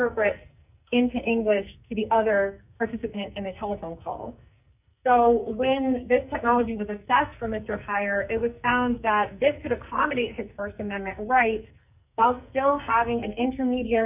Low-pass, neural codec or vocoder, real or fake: 3.6 kHz; codec, 44.1 kHz, 2.6 kbps, SNAC; fake